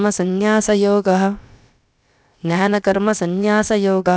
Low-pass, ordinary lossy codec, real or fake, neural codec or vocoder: none; none; fake; codec, 16 kHz, about 1 kbps, DyCAST, with the encoder's durations